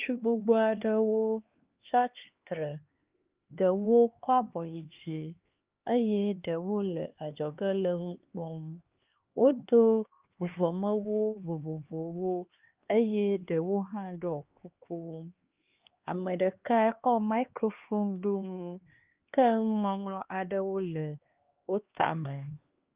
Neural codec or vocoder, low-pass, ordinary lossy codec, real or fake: codec, 16 kHz, 2 kbps, X-Codec, HuBERT features, trained on LibriSpeech; 3.6 kHz; Opus, 24 kbps; fake